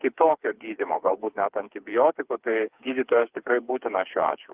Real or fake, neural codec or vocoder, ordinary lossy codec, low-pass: fake; codec, 16 kHz, 4 kbps, FreqCodec, smaller model; Opus, 24 kbps; 3.6 kHz